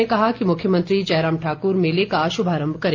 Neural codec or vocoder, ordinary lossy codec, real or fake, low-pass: none; Opus, 32 kbps; real; 7.2 kHz